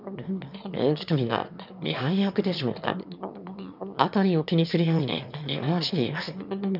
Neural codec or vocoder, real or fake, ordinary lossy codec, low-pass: autoencoder, 22.05 kHz, a latent of 192 numbers a frame, VITS, trained on one speaker; fake; none; 5.4 kHz